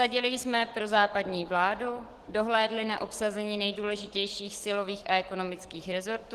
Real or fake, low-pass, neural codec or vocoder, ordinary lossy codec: fake; 14.4 kHz; codec, 44.1 kHz, 7.8 kbps, DAC; Opus, 16 kbps